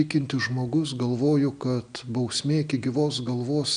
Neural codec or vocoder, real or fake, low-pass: none; real; 9.9 kHz